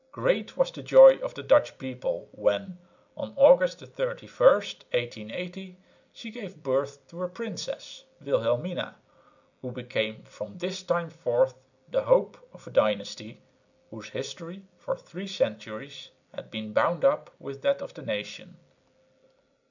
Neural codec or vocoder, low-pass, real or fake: none; 7.2 kHz; real